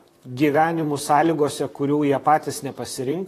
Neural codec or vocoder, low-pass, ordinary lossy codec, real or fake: vocoder, 44.1 kHz, 128 mel bands, Pupu-Vocoder; 14.4 kHz; AAC, 48 kbps; fake